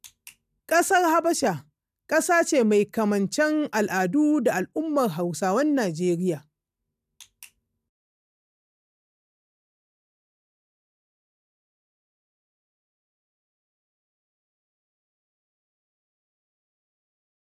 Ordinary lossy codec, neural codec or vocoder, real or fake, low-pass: none; none; real; 14.4 kHz